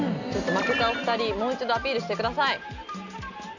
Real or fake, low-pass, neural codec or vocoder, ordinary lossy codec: real; 7.2 kHz; none; none